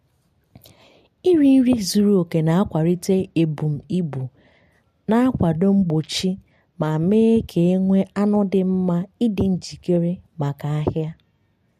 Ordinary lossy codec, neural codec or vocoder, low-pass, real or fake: MP3, 64 kbps; none; 14.4 kHz; real